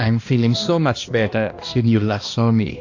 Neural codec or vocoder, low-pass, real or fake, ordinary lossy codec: codec, 16 kHz, 1 kbps, X-Codec, HuBERT features, trained on balanced general audio; 7.2 kHz; fake; AAC, 48 kbps